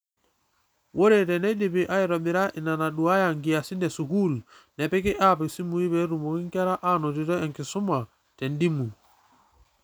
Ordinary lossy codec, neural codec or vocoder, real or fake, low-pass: none; none; real; none